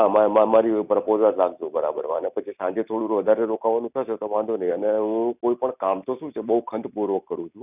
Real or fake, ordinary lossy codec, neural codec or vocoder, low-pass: real; none; none; 3.6 kHz